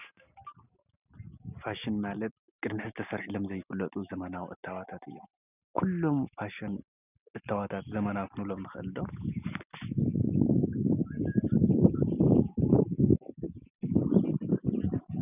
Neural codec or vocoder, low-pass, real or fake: none; 3.6 kHz; real